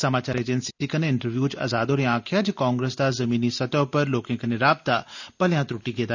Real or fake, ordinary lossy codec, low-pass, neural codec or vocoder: real; none; 7.2 kHz; none